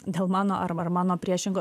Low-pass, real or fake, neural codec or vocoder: 14.4 kHz; fake; vocoder, 44.1 kHz, 128 mel bands every 512 samples, BigVGAN v2